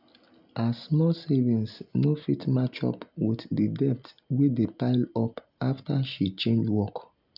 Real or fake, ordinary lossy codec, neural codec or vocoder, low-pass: real; none; none; 5.4 kHz